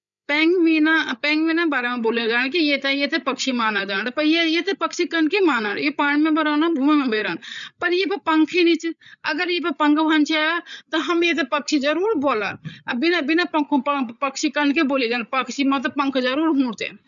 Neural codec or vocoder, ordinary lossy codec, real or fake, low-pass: codec, 16 kHz, 8 kbps, FreqCodec, larger model; none; fake; 7.2 kHz